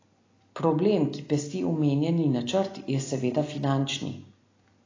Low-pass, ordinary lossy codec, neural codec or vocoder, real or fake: 7.2 kHz; AAC, 32 kbps; none; real